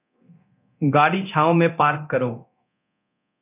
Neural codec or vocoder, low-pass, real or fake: codec, 24 kHz, 0.9 kbps, DualCodec; 3.6 kHz; fake